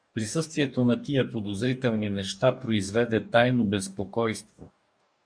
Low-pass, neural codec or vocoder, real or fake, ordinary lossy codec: 9.9 kHz; codec, 44.1 kHz, 2.6 kbps, DAC; fake; MP3, 64 kbps